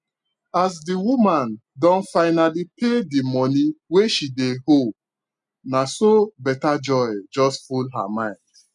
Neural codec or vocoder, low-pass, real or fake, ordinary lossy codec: none; 10.8 kHz; real; none